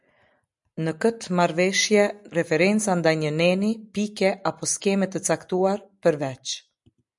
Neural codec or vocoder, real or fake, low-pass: none; real; 10.8 kHz